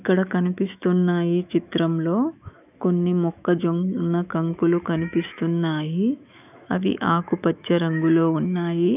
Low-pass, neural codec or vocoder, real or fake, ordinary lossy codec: 3.6 kHz; autoencoder, 48 kHz, 128 numbers a frame, DAC-VAE, trained on Japanese speech; fake; none